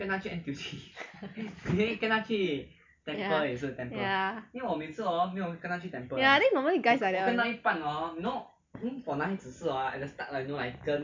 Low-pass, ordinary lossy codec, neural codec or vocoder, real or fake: 7.2 kHz; MP3, 64 kbps; none; real